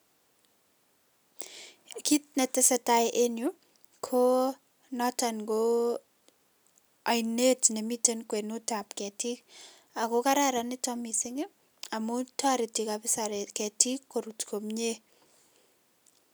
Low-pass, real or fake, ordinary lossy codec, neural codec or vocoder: none; real; none; none